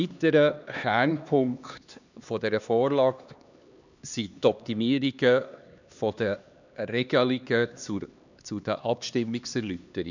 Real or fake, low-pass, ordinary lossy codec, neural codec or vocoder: fake; 7.2 kHz; none; codec, 16 kHz, 2 kbps, X-Codec, HuBERT features, trained on LibriSpeech